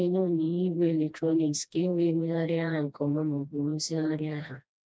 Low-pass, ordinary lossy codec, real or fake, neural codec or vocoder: none; none; fake; codec, 16 kHz, 1 kbps, FreqCodec, smaller model